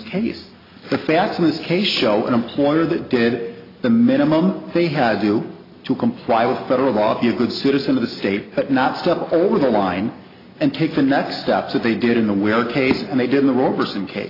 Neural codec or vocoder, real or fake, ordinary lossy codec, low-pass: none; real; AAC, 24 kbps; 5.4 kHz